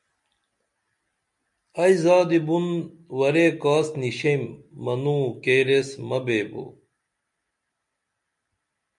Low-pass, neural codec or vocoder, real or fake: 10.8 kHz; none; real